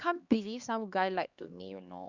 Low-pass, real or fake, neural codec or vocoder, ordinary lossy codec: 7.2 kHz; fake; codec, 16 kHz, 2 kbps, X-Codec, HuBERT features, trained on LibriSpeech; Opus, 64 kbps